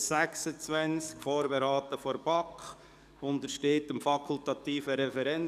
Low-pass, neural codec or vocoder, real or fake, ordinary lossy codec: 14.4 kHz; codec, 44.1 kHz, 7.8 kbps, DAC; fake; none